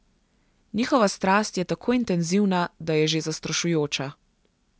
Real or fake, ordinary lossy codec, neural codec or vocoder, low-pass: real; none; none; none